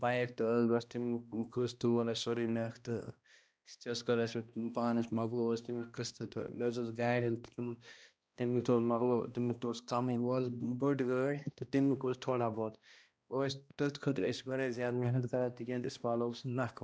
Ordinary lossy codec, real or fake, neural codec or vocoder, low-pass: none; fake; codec, 16 kHz, 1 kbps, X-Codec, HuBERT features, trained on balanced general audio; none